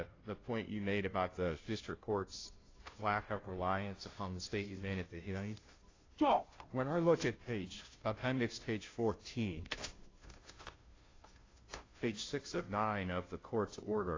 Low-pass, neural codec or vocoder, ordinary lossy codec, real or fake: 7.2 kHz; codec, 16 kHz, 0.5 kbps, FunCodec, trained on Chinese and English, 25 frames a second; AAC, 32 kbps; fake